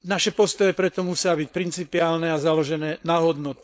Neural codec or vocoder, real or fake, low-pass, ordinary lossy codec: codec, 16 kHz, 4.8 kbps, FACodec; fake; none; none